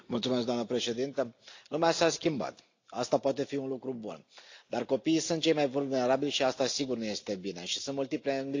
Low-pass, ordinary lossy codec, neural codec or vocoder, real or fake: 7.2 kHz; AAC, 48 kbps; none; real